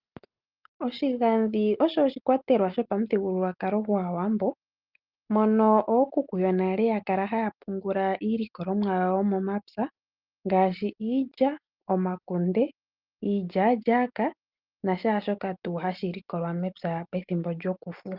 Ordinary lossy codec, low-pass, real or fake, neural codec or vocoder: Opus, 24 kbps; 5.4 kHz; real; none